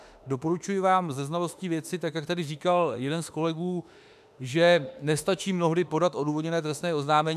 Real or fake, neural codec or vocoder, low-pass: fake; autoencoder, 48 kHz, 32 numbers a frame, DAC-VAE, trained on Japanese speech; 14.4 kHz